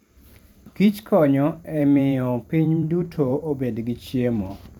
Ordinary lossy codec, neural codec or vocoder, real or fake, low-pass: none; vocoder, 44.1 kHz, 128 mel bands every 512 samples, BigVGAN v2; fake; 19.8 kHz